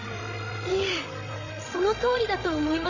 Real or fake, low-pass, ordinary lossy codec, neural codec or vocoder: fake; 7.2 kHz; MP3, 32 kbps; codec, 16 kHz, 16 kbps, FreqCodec, larger model